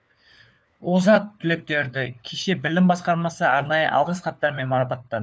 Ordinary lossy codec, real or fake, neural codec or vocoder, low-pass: none; fake; codec, 16 kHz, 4 kbps, FunCodec, trained on LibriTTS, 50 frames a second; none